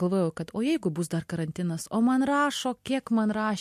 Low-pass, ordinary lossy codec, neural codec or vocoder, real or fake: 14.4 kHz; MP3, 64 kbps; none; real